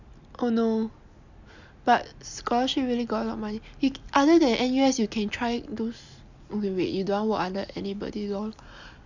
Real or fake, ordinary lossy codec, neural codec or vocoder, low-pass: real; none; none; 7.2 kHz